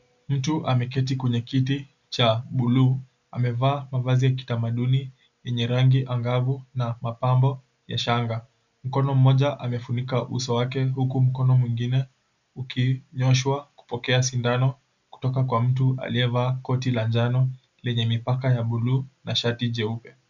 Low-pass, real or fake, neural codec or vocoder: 7.2 kHz; real; none